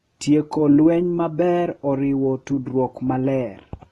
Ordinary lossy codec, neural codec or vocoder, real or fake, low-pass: AAC, 32 kbps; none; real; 19.8 kHz